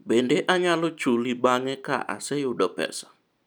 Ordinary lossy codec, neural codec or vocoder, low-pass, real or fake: none; none; none; real